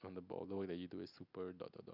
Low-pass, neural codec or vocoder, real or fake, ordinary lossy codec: 5.4 kHz; none; real; none